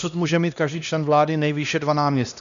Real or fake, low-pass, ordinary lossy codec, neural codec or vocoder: fake; 7.2 kHz; MP3, 96 kbps; codec, 16 kHz, 1 kbps, X-Codec, HuBERT features, trained on LibriSpeech